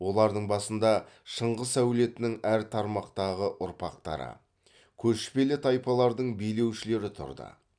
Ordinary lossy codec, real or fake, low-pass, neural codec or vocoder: none; real; 9.9 kHz; none